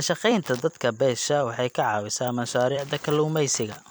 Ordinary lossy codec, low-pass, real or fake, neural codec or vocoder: none; none; fake; vocoder, 44.1 kHz, 128 mel bands every 256 samples, BigVGAN v2